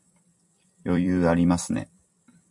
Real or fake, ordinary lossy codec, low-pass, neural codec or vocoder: fake; MP3, 64 kbps; 10.8 kHz; vocoder, 44.1 kHz, 128 mel bands every 256 samples, BigVGAN v2